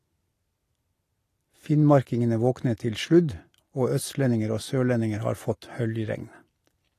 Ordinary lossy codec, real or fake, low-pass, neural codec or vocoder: AAC, 48 kbps; real; 14.4 kHz; none